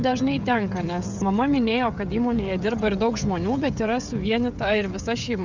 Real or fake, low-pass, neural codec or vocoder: fake; 7.2 kHz; codec, 16 kHz, 16 kbps, FreqCodec, smaller model